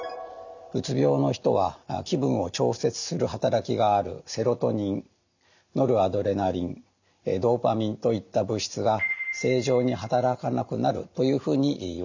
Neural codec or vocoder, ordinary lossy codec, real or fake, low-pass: none; none; real; 7.2 kHz